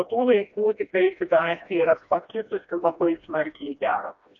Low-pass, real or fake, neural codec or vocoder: 7.2 kHz; fake; codec, 16 kHz, 1 kbps, FreqCodec, smaller model